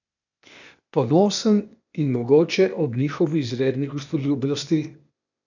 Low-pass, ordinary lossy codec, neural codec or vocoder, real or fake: 7.2 kHz; none; codec, 16 kHz, 0.8 kbps, ZipCodec; fake